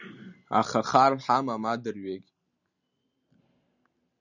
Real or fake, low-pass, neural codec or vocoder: real; 7.2 kHz; none